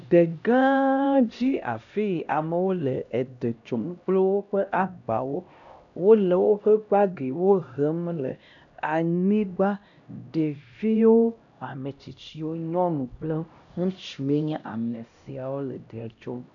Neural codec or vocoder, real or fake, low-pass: codec, 16 kHz, 1 kbps, X-Codec, HuBERT features, trained on LibriSpeech; fake; 7.2 kHz